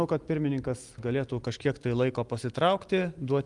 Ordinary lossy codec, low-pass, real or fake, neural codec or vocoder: Opus, 32 kbps; 10.8 kHz; real; none